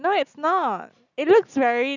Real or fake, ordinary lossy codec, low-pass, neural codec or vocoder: real; none; 7.2 kHz; none